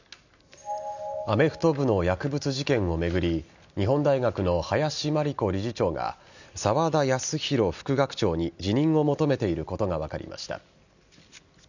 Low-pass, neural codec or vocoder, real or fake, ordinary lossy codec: 7.2 kHz; none; real; none